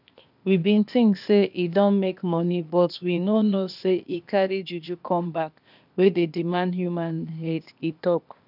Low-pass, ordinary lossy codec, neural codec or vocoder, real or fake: 5.4 kHz; none; codec, 16 kHz, 0.8 kbps, ZipCodec; fake